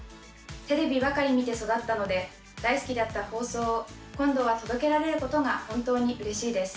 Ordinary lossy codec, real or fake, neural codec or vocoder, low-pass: none; real; none; none